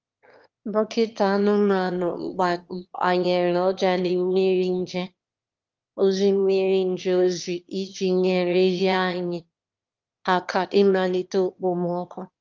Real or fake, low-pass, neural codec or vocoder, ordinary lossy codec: fake; 7.2 kHz; autoencoder, 22.05 kHz, a latent of 192 numbers a frame, VITS, trained on one speaker; Opus, 24 kbps